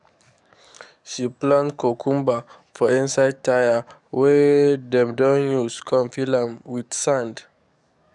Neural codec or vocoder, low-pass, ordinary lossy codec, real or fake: codec, 44.1 kHz, 7.8 kbps, DAC; 10.8 kHz; none; fake